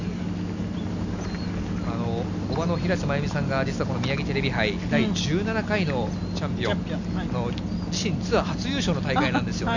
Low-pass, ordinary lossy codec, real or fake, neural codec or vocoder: 7.2 kHz; none; real; none